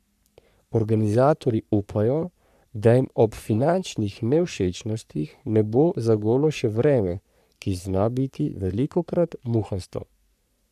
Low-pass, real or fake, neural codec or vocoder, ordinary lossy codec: 14.4 kHz; fake; codec, 44.1 kHz, 3.4 kbps, Pupu-Codec; none